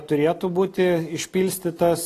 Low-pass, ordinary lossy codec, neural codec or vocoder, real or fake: 14.4 kHz; AAC, 48 kbps; none; real